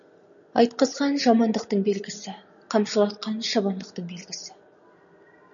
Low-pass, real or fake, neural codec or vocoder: 7.2 kHz; real; none